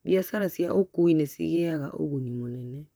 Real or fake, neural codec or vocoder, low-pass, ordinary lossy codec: fake; vocoder, 44.1 kHz, 128 mel bands, Pupu-Vocoder; none; none